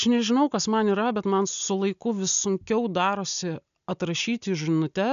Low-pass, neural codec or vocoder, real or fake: 7.2 kHz; none; real